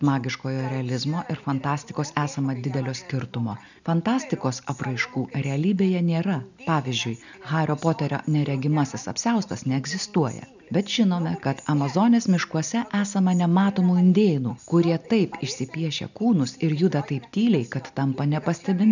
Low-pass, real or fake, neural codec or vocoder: 7.2 kHz; real; none